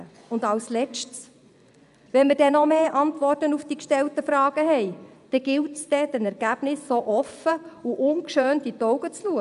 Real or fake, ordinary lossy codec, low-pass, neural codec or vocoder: fake; none; 10.8 kHz; vocoder, 24 kHz, 100 mel bands, Vocos